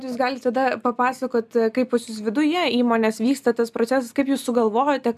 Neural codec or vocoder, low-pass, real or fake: none; 14.4 kHz; real